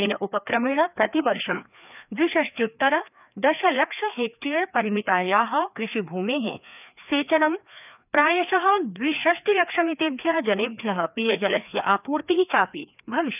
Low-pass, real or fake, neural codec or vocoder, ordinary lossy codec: 3.6 kHz; fake; codec, 16 kHz, 2 kbps, FreqCodec, larger model; none